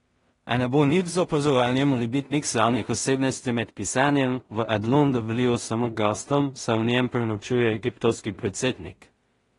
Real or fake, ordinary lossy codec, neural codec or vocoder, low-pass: fake; AAC, 32 kbps; codec, 16 kHz in and 24 kHz out, 0.4 kbps, LongCat-Audio-Codec, two codebook decoder; 10.8 kHz